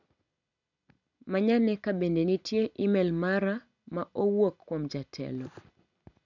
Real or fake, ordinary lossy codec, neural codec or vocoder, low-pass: real; none; none; 7.2 kHz